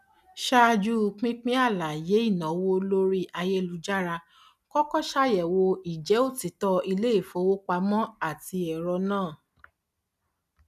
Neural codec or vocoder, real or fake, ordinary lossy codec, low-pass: none; real; none; 14.4 kHz